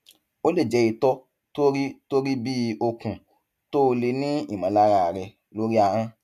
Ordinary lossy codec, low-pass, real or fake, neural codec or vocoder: none; 14.4 kHz; real; none